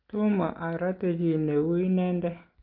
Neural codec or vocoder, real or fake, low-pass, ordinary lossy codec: none; real; 5.4 kHz; Opus, 32 kbps